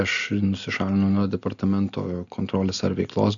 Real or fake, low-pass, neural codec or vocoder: real; 7.2 kHz; none